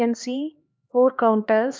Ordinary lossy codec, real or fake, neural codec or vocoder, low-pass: none; fake; codec, 16 kHz, 2 kbps, X-Codec, HuBERT features, trained on LibriSpeech; none